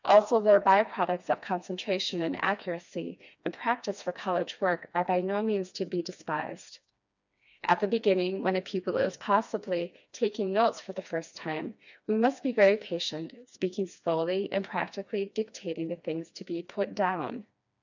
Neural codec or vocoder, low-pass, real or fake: codec, 16 kHz, 2 kbps, FreqCodec, smaller model; 7.2 kHz; fake